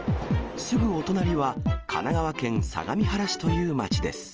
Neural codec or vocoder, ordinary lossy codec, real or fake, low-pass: none; Opus, 24 kbps; real; 7.2 kHz